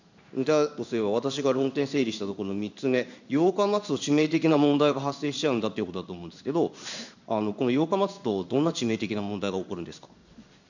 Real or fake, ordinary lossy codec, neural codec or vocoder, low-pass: fake; none; codec, 16 kHz in and 24 kHz out, 1 kbps, XY-Tokenizer; 7.2 kHz